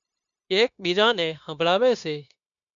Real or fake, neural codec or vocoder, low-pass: fake; codec, 16 kHz, 0.9 kbps, LongCat-Audio-Codec; 7.2 kHz